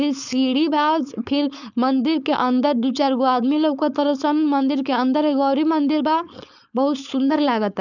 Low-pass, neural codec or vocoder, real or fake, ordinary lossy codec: 7.2 kHz; codec, 16 kHz, 4.8 kbps, FACodec; fake; none